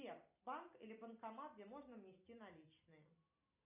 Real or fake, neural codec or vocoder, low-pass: real; none; 3.6 kHz